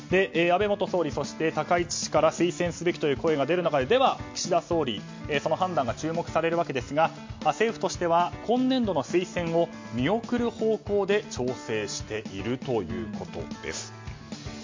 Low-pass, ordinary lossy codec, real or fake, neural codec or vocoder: 7.2 kHz; MP3, 48 kbps; real; none